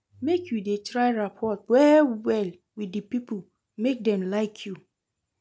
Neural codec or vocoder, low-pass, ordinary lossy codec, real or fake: none; none; none; real